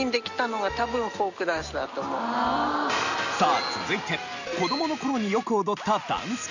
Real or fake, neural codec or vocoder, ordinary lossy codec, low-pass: real; none; none; 7.2 kHz